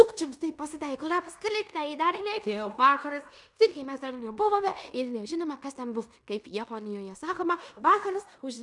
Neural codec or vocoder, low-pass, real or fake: codec, 16 kHz in and 24 kHz out, 0.9 kbps, LongCat-Audio-Codec, fine tuned four codebook decoder; 10.8 kHz; fake